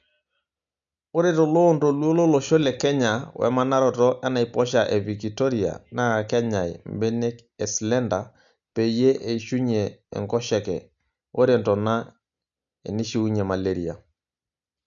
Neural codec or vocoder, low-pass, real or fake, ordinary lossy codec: none; 7.2 kHz; real; none